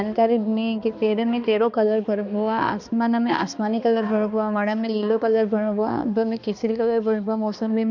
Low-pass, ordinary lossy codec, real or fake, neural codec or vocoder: 7.2 kHz; none; fake; codec, 16 kHz, 2 kbps, X-Codec, HuBERT features, trained on balanced general audio